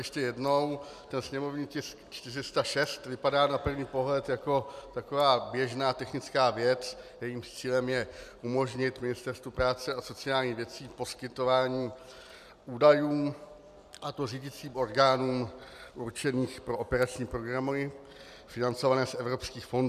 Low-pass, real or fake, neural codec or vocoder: 14.4 kHz; real; none